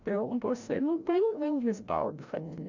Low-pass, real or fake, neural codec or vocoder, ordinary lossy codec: 7.2 kHz; fake; codec, 16 kHz, 0.5 kbps, FreqCodec, larger model; MP3, 64 kbps